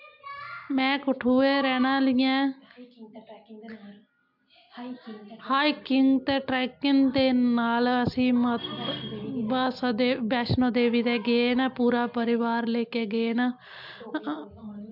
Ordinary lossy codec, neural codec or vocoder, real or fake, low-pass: MP3, 48 kbps; none; real; 5.4 kHz